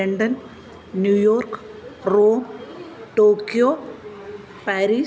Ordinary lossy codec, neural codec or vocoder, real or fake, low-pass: none; none; real; none